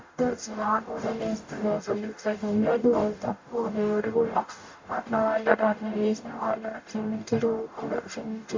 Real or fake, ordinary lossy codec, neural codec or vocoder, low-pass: fake; MP3, 48 kbps; codec, 44.1 kHz, 0.9 kbps, DAC; 7.2 kHz